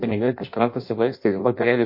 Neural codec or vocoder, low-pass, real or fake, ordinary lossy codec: codec, 16 kHz in and 24 kHz out, 0.6 kbps, FireRedTTS-2 codec; 5.4 kHz; fake; MP3, 32 kbps